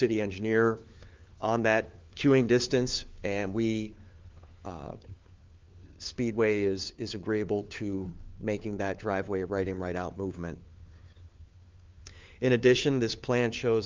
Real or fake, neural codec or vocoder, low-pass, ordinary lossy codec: fake; codec, 16 kHz, 4 kbps, FunCodec, trained on LibriTTS, 50 frames a second; 7.2 kHz; Opus, 24 kbps